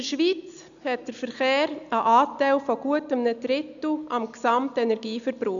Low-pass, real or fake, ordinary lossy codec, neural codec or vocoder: 7.2 kHz; real; none; none